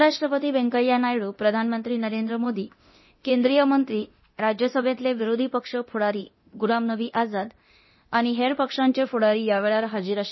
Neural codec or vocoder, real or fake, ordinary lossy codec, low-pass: codec, 24 kHz, 0.9 kbps, DualCodec; fake; MP3, 24 kbps; 7.2 kHz